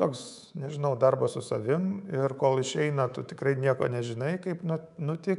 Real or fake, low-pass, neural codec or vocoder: fake; 10.8 kHz; codec, 24 kHz, 3.1 kbps, DualCodec